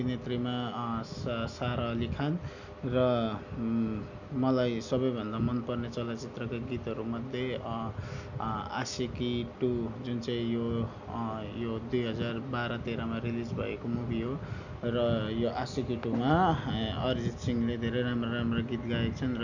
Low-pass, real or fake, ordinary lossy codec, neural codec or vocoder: 7.2 kHz; real; none; none